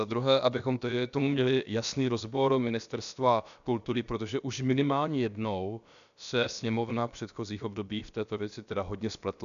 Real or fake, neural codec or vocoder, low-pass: fake; codec, 16 kHz, about 1 kbps, DyCAST, with the encoder's durations; 7.2 kHz